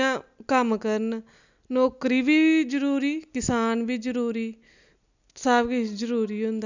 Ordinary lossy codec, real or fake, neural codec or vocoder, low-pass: none; real; none; 7.2 kHz